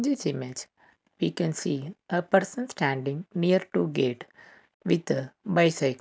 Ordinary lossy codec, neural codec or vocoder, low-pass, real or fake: none; none; none; real